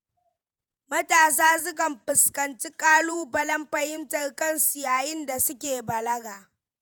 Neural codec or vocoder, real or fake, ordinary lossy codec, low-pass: vocoder, 48 kHz, 128 mel bands, Vocos; fake; none; none